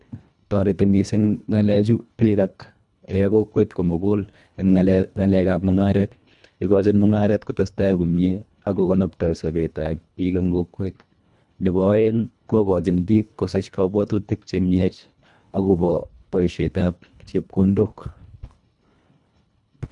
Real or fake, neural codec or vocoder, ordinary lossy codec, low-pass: fake; codec, 24 kHz, 1.5 kbps, HILCodec; none; 10.8 kHz